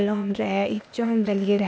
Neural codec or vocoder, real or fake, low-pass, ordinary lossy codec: codec, 16 kHz, 0.8 kbps, ZipCodec; fake; none; none